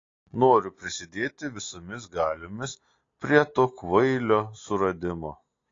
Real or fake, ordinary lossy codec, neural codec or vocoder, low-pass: real; AAC, 32 kbps; none; 7.2 kHz